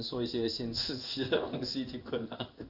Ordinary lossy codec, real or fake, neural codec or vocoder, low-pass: none; fake; codec, 16 kHz in and 24 kHz out, 1 kbps, XY-Tokenizer; 5.4 kHz